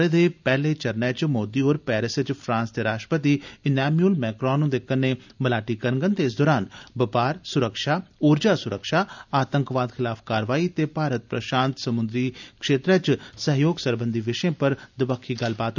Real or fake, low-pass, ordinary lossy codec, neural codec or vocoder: real; 7.2 kHz; none; none